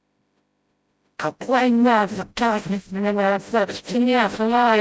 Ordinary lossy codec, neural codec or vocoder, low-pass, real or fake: none; codec, 16 kHz, 0.5 kbps, FreqCodec, smaller model; none; fake